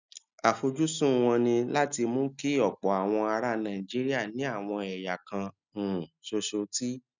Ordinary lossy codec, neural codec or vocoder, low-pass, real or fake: none; none; 7.2 kHz; real